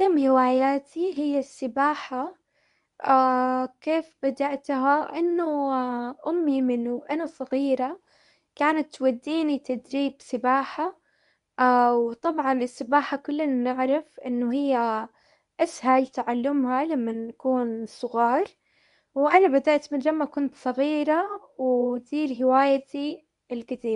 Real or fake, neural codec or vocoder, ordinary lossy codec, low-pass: fake; codec, 24 kHz, 0.9 kbps, WavTokenizer, medium speech release version 1; none; 10.8 kHz